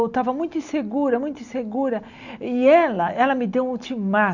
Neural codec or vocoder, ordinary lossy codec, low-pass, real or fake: none; none; 7.2 kHz; real